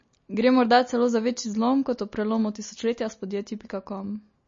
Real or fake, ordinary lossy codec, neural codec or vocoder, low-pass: real; MP3, 32 kbps; none; 7.2 kHz